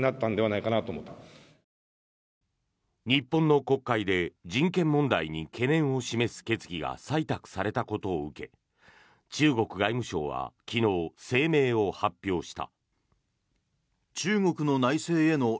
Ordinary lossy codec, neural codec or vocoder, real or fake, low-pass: none; none; real; none